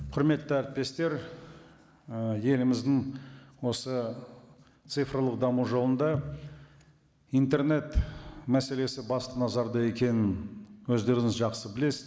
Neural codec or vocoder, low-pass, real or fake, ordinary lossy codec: none; none; real; none